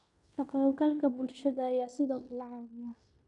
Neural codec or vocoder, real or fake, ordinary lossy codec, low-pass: codec, 16 kHz in and 24 kHz out, 0.9 kbps, LongCat-Audio-Codec, fine tuned four codebook decoder; fake; MP3, 96 kbps; 10.8 kHz